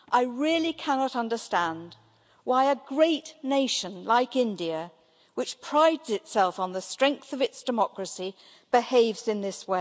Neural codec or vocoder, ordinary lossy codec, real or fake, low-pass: none; none; real; none